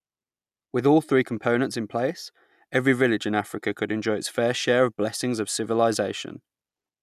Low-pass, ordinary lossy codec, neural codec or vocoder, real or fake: 14.4 kHz; none; none; real